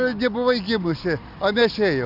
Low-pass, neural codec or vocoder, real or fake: 5.4 kHz; none; real